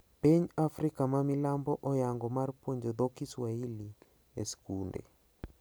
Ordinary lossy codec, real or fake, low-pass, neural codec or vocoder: none; real; none; none